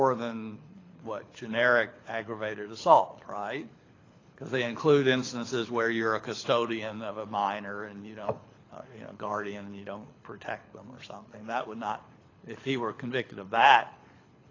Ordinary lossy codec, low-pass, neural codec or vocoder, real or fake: AAC, 32 kbps; 7.2 kHz; codec, 24 kHz, 6 kbps, HILCodec; fake